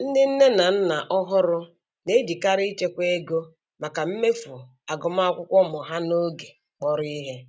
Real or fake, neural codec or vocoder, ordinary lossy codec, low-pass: real; none; none; none